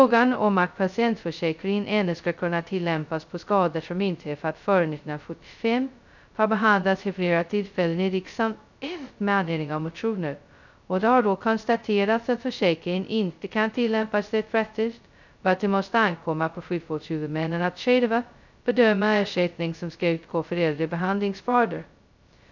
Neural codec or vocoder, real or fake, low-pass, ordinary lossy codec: codec, 16 kHz, 0.2 kbps, FocalCodec; fake; 7.2 kHz; none